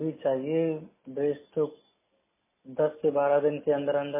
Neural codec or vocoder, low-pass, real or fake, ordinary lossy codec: none; 3.6 kHz; real; MP3, 16 kbps